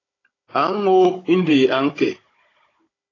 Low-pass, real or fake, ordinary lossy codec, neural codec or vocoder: 7.2 kHz; fake; AAC, 32 kbps; codec, 16 kHz, 16 kbps, FunCodec, trained on Chinese and English, 50 frames a second